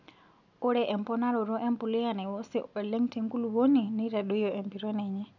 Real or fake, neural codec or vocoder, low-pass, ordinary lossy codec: real; none; 7.2 kHz; none